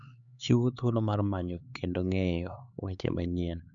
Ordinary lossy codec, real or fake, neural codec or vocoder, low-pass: none; fake; codec, 16 kHz, 4 kbps, X-Codec, HuBERT features, trained on LibriSpeech; 7.2 kHz